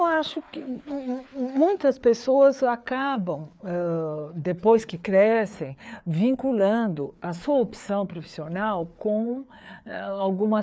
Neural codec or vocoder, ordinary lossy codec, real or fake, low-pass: codec, 16 kHz, 4 kbps, FreqCodec, larger model; none; fake; none